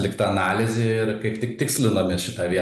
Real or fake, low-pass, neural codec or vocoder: real; 14.4 kHz; none